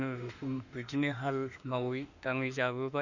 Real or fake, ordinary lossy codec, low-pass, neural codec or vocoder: fake; none; 7.2 kHz; autoencoder, 48 kHz, 32 numbers a frame, DAC-VAE, trained on Japanese speech